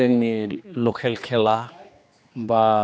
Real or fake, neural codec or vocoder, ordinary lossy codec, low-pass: fake; codec, 16 kHz, 2 kbps, X-Codec, HuBERT features, trained on general audio; none; none